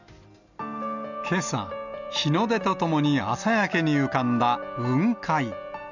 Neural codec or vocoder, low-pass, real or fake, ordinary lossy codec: none; 7.2 kHz; real; none